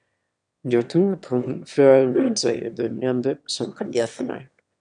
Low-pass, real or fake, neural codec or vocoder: 9.9 kHz; fake; autoencoder, 22.05 kHz, a latent of 192 numbers a frame, VITS, trained on one speaker